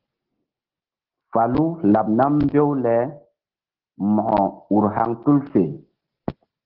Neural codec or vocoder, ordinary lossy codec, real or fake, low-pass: none; Opus, 16 kbps; real; 5.4 kHz